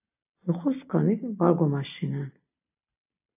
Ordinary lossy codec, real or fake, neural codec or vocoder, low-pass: AAC, 32 kbps; real; none; 3.6 kHz